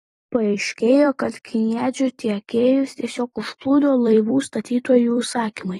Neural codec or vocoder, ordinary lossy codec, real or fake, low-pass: vocoder, 44.1 kHz, 128 mel bands every 256 samples, BigVGAN v2; AAC, 32 kbps; fake; 19.8 kHz